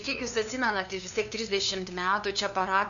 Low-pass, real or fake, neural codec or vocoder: 7.2 kHz; fake; codec, 16 kHz, 2 kbps, FunCodec, trained on LibriTTS, 25 frames a second